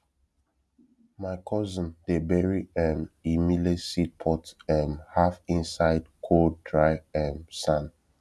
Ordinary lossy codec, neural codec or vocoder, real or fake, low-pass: none; none; real; none